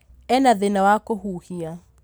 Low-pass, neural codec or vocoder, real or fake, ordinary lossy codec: none; none; real; none